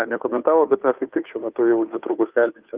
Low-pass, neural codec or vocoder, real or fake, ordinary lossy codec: 3.6 kHz; codec, 16 kHz, 2 kbps, FunCodec, trained on Chinese and English, 25 frames a second; fake; Opus, 32 kbps